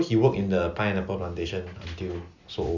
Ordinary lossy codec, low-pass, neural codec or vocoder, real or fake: none; 7.2 kHz; none; real